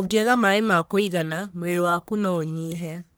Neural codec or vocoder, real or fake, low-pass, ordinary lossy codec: codec, 44.1 kHz, 1.7 kbps, Pupu-Codec; fake; none; none